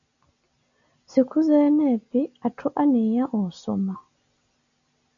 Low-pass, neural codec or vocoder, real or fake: 7.2 kHz; none; real